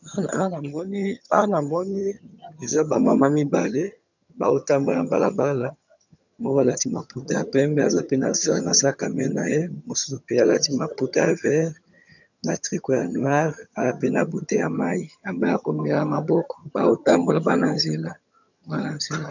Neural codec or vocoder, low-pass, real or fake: vocoder, 22.05 kHz, 80 mel bands, HiFi-GAN; 7.2 kHz; fake